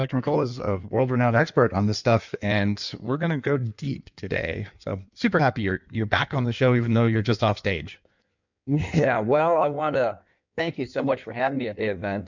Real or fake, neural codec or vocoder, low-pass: fake; codec, 16 kHz in and 24 kHz out, 1.1 kbps, FireRedTTS-2 codec; 7.2 kHz